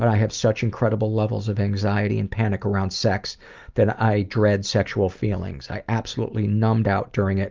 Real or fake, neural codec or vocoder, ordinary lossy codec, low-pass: real; none; Opus, 24 kbps; 7.2 kHz